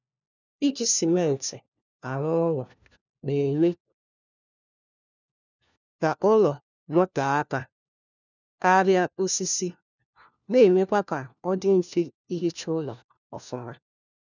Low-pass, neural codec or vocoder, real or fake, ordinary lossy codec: 7.2 kHz; codec, 16 kHz, 1 kbps, FunCodec, trained on LibriTTS, 50 frames a second; fake; none